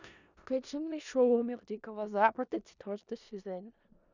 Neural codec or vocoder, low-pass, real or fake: codec, 16 kHz in and 24 kHz out, 0.4 kbps, LongCat-Audio-Codec, four codebook decoder; 7.2 kHz; fake